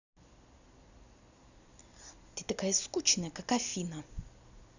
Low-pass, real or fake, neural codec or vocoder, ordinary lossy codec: 7.2 kHz; real; none; none